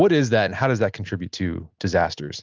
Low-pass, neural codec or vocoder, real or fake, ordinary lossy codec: 7.2 kHz; none; real; Opus, 32 kbps